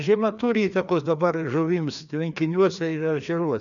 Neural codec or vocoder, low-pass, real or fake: codec, 16 kHz, 2 kbps, FreqCodec, larger model; 7.2 kHz; fake